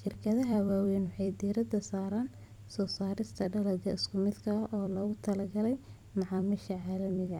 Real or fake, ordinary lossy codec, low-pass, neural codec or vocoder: fake; none; 19.8 kHz; vocoder, 44.1 kHz, 128 mel bands every 256 samples, BigVGAN v2